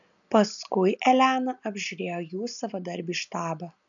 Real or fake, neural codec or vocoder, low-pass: real; none; 7.2 kHz